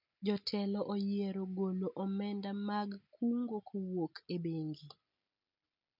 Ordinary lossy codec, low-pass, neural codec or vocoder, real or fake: none; 5.4 kHz; none; real